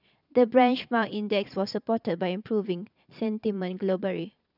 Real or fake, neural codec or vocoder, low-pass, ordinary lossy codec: fake; vocoder, 22.05 kHz, 80 mel bands, Vocos; 5.4 kHz; none